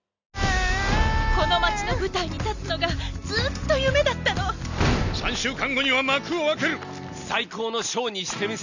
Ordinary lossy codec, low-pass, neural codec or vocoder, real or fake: none; 7.2 kHz; none; real